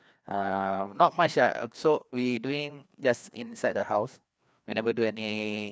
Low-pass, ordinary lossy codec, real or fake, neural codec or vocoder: none; none; fake; codec, 16 kHz, 2 kbps, FreqCodec, larger model